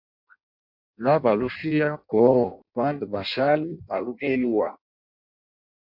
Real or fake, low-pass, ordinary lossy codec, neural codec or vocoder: fake; 5.4 kHz; MP3, 48 kbps; codec, 16 kHz in and 24 kHz out, 0.6 kbps, FireRedTTS-2 codec